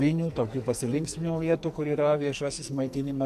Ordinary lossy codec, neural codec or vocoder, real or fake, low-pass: MP3, 96 kbps; codec, 44.1 kHz, 2.6 kbps, SNAC; fake; 14.4 kHz